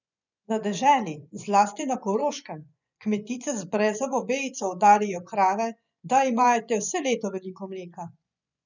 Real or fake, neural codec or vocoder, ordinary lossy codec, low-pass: fake; vocoder, 24 kHz, 100 mel bands, Vocos; none; 7.2 kHz